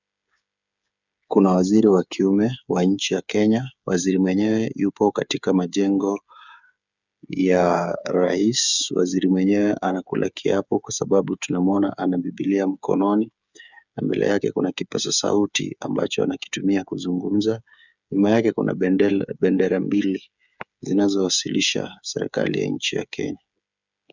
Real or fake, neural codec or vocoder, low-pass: fake; codec, 16 kHz, 8 kbps, FreqCodec, smaller model; 7.2 kHz